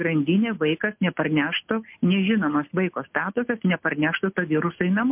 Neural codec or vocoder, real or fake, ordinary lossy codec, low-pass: none; real; MP3, 32 kbps; 3.6 kHz